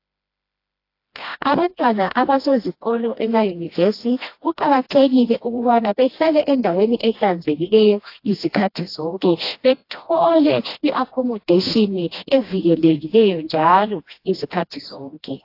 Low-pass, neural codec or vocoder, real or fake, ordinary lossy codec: 5.4 kHz; codec, 16 kHz, 1 kbps, FreqCodec, smaller model; fake; AAC, 32 kbps